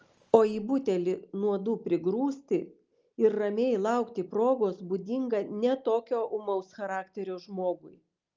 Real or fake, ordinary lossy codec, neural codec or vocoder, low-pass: real; Opus, 24 kbps; none; 7.2 kHz